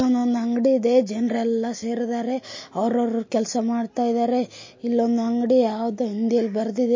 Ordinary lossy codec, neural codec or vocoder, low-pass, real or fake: MP3, 32 kbps; none; 7.2 kHz; real